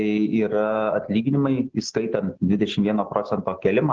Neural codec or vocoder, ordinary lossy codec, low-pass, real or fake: none; Opus, 16 kbps; 7.2 kHz; real